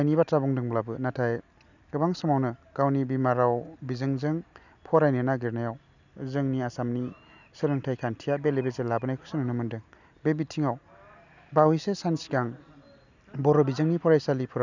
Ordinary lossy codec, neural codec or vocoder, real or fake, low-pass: none; none; real; 7.2 kHz